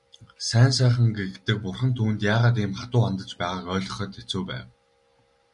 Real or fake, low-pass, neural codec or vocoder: real; 10.8 kHz; none